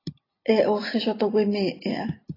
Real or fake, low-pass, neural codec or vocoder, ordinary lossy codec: real; 5.4 kHz; none; AAC, 24 kbps